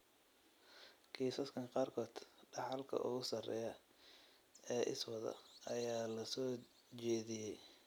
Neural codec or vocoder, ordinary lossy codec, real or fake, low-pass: none; none; real; none